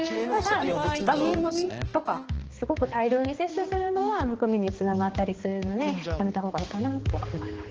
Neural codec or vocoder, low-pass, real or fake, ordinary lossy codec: codec, 16 kHz, 2 kbps, X-Codec, HuBERT features, trained on balanced general audio; 7.2 kHz; fake; Opus, 16 kbps